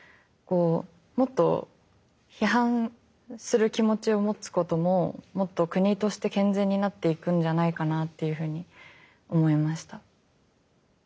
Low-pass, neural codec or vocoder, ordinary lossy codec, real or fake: none; none; none; real